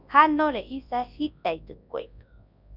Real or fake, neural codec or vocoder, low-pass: fake; codec, 24 kHz, 0.9 kbps, WavTokenizer, large speech release; 5.4 kHz